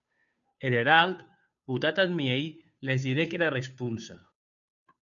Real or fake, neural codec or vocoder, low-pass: fake; codec, 16 kHz, 2 kbps, FunCodec, trained on Chinese and English, 25 frames a second; 7.2 kHz